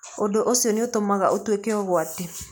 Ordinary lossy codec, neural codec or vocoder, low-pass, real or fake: none; none; none; real